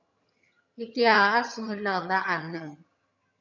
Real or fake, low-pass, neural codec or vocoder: fake; 7.2 kHz; vocoder, 22.05 kHz, 80 mel bands, HiFi-GAN